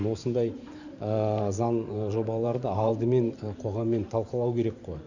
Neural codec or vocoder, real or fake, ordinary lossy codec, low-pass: vocoder, 44.1 kHz, 128 mel bands every 512 samples, BigVGAN v2; fake; none; 7.2 kHz